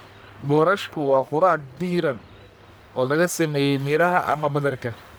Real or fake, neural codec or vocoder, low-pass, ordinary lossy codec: fake; codec, 44.1 kHz, 1.7 kbps, Pupu-Codec; none; none